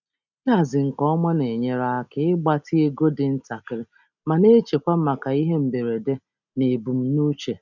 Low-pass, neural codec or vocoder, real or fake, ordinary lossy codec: 7.2 kHz; none; real; none